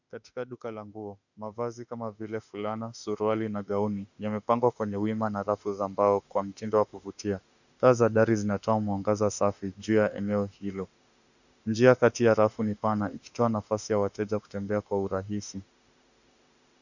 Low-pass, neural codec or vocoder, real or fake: 7.2 kHz; autoencoder, 48 kHz, 32 numbers a frame, DAC-VAE, trained on Japanese speech; fake